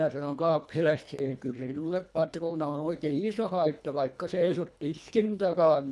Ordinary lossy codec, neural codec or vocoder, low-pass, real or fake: none; codec, 24 kHz, 1.5 kbps, HILCodec; none; fake